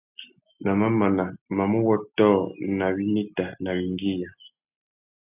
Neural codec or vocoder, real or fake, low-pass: none; real; 3.6 kHz